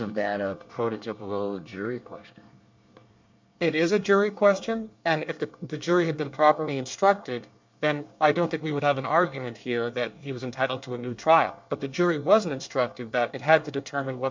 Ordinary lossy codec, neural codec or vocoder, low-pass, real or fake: MP3, 64 kbps; codec, 24 kHz, 1 kbps, SNAC; 7.2 kHz; fake